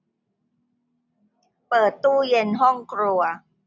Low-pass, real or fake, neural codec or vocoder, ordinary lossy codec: none; real; none; none